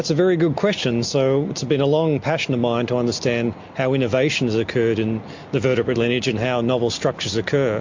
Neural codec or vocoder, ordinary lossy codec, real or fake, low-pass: none; MP3, 48 kbps; real; 7.2 kHz